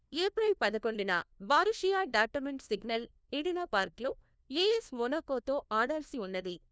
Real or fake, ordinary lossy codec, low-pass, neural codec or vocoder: fake; none; none; codec, 16 kHz, 1 kbps, FunCodec, trained on LibriTTS, 50 frames a second